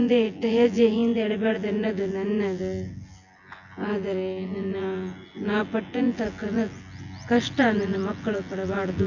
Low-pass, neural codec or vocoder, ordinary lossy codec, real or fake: 7.2 kHz; vocoder, 24 kHz, 100 mel bands, Vocos; none; fake